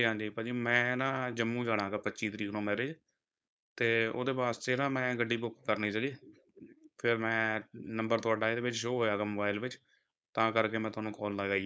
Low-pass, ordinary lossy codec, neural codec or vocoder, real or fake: none; none; codec, 16 kHz, 4.8 kbps, FACodec; fake